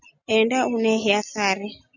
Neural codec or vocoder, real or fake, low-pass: none; real; 7.2 kHz